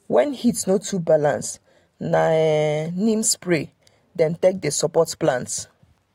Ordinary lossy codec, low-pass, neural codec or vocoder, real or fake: AAC, 48 kbps; 19.8 kHz; none; real